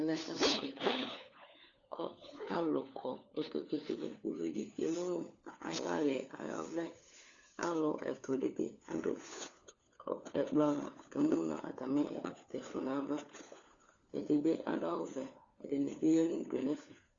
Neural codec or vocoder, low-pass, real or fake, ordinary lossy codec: codec, 16 kHz, 4 kbps, FunCodec, trained on LibriTTS, 50 frames a second; 7.2 kHz; fake; Opus, 64 kbps